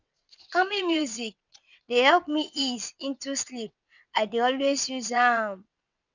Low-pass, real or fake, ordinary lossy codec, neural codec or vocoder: 7.2 kHz; fake; none; vocoder, 22.05 kHz, 80 mel bands, WaveNeXt